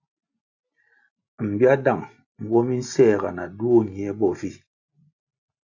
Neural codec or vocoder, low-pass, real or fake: none; 7.2 kHz; real